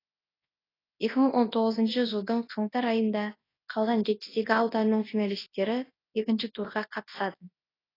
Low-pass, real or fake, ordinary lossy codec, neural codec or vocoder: 5.4 kHz; fake; AAC, 24 kbps; codec, 24 kHz, 0.9 kbps, WavTokenizer, large speech release